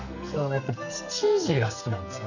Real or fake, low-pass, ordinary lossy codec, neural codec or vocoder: fake; 7.2 kHz; none; codec, 44.1 kHz, 2.6 kbps, SNAC